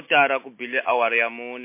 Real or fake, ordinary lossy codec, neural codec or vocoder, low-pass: real; MP3, 24 kbps; none; 3.6 kHz